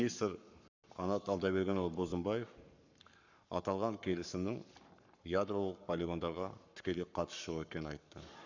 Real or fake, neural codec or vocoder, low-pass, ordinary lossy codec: fake; codec, 44.1 kHz, 7.8 kbps, Pupu-Codec; 7.2 kHz; none